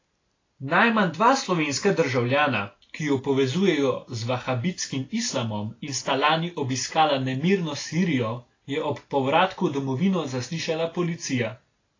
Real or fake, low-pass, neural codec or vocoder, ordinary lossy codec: real; 7.2 kHz; none; AAC, 32 kbps